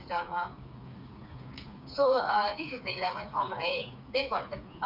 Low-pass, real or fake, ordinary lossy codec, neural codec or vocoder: 5.4 kHz; fake; none; codec, 16 kHz, 4 kbps, FreqCodec, smaller model